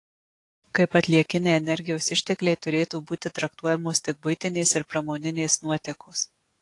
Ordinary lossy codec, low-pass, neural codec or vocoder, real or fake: AAC, 48 kbps; 10.8 kHz; codec, 44.1 kHz, 7.8 kbps, DAC; fake